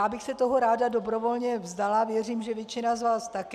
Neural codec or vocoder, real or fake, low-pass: none; real; 14.4 kHz